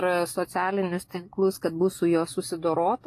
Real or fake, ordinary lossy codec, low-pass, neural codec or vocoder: real; AAC, 48 kbps; 14.4 kHz; none